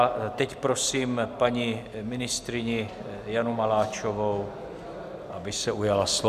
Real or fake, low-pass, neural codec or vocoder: real; 14.4 kHz; none